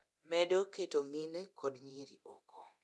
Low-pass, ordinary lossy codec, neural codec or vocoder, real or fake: none; none; codec, 24 kHz, 0.9 kbps, DualCodec; fake